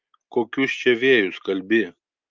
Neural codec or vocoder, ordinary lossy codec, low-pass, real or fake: none; Opus, 24 kbps; 7.2 kHz; real